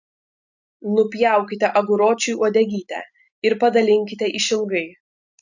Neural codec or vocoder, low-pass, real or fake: none; 7.2 kHz; real